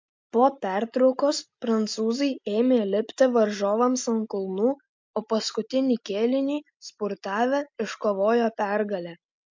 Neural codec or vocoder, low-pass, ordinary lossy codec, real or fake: none; 7.2 kHz; MP3, 64 kbps; real